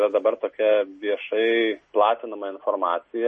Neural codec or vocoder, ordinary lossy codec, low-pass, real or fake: none; MP3, 32 kbps; 10.8 kHz; real